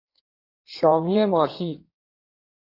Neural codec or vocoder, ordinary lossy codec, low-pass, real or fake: codec, 16 kHz in and 24 kHz out, 1.1 kbps, FireRedTTS-2 codec; AAC, 32 kbps; 5.4 kHz; fake